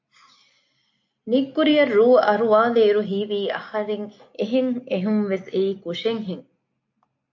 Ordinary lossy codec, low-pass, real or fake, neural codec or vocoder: MP3, 48 kbps; 7.2 kHz; real; none